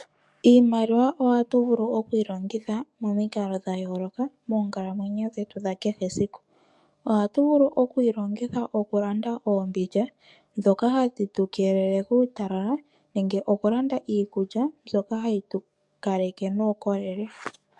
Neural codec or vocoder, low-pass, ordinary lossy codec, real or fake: codec, 44.1 kHz, 7.8 kbps, DAC; 10.8 kHz; MP3, 64 kbps; fake